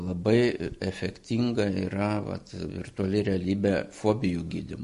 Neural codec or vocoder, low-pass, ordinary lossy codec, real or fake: none; 14.4 kHz; MP3, 48 kbps; real